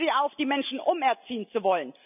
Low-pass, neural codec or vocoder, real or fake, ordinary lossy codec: 3.6 kHz; none; real; none